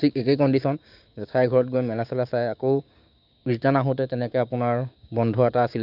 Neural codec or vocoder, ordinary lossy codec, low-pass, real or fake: codec, 16 kHz, 6 kbps, DAC; none; 5.4 kHz; fake